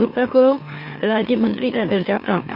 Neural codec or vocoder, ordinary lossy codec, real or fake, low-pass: autoencoder, 44.1 kHz, a latent of 192 numbers a frame, MeloTTS; MP3, 32 kbps; fake; 5.4 kHz